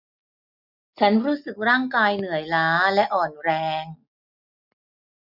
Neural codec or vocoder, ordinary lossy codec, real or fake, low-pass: none; none; real; 5.4 kHz